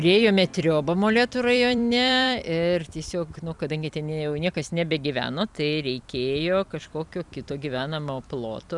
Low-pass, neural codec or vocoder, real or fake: 10.8 kHz; vocoder, 44.1 kHz, 128 mel bands every 256 samples, BigVGAN v2; fake